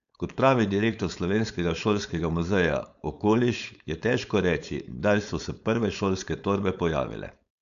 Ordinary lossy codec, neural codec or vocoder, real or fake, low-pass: none; codec, 16 kHz, 4.8 kbps, FACodec; fake; 7.2 kHz